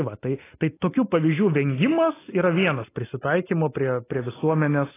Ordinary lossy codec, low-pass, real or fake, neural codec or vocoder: AAC, 16 kbps; 3.6 kHz; real; none